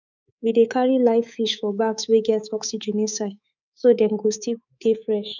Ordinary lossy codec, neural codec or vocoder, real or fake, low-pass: none; codec, 24 kHz, 3.1 kbps, DualCodec; fake; 7.2 kHz